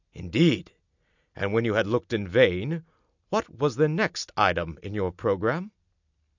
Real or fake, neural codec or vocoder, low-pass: real; none; 7.2 kHz